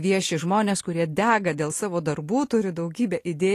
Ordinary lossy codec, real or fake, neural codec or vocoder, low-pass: AAC, 48 kbps; real; none; 14.4 kHz